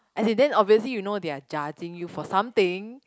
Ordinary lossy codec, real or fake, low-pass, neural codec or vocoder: none; real; none; none